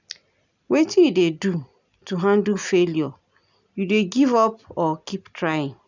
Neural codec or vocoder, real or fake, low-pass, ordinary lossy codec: none; real; 7.2 kHz; none